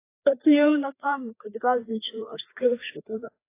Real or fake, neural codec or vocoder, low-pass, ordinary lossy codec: fake; codec, 16 kHz, 2 kbps, FreqCodec, larger model; 3.6 kHz; AAC, 24 kbps